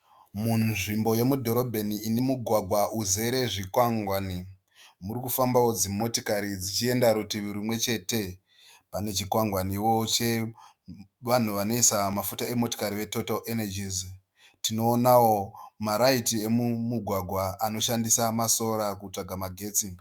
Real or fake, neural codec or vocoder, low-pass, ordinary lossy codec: fake; autoencoder, 48 kHz, 128 numbers a frame, DAC-VAE, trained on Japanese speech; 19.8 kHz; Opus, 64 kbps